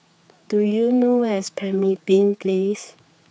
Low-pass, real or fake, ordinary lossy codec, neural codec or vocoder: none; fake; none; codec, 16 kHz, 4 kbps, X-Codec, HuBERT features, trained on general audio